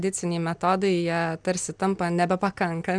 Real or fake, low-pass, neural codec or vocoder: real; 9.9 kHz; none